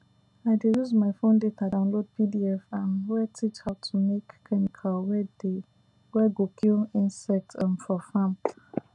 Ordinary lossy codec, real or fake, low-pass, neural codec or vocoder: none; real; 10.8 kHz; none